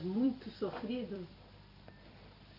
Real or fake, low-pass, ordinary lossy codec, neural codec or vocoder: fake; 5.4 kHz; none; vocoder, 44.1 kHz, 128 mel bands every 256 samples, BigVGAN v2